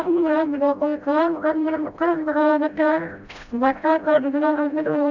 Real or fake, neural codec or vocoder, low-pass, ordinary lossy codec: fake; codec, 16 kHz, 0.5 kbps, FreqCodec, smaller model; 7.2 kHz; none